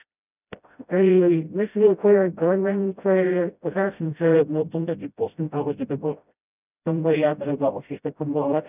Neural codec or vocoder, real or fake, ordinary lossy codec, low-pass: codec, 16 kHz, 0.5 kbps, FreqCodec, smaller model; fake; none; 3.6 kHz